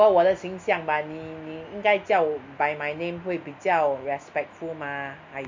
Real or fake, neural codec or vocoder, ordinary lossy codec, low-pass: real; none; MP3, 48 kbps; 7.2 kHz